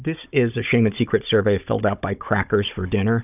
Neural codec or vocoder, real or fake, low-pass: codec, 16 kHz, 16 kbps, FunCodec, trained on Chinese and English, 50 frames a second; fake; 3.6 kHz